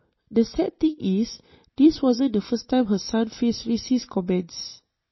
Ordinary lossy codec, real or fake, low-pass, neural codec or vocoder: MP3, 24 kbps; fake; 7.2 kHz; vocoder, 44.1 kHz, 128 mel bands every 256 samples, BigVGAN v2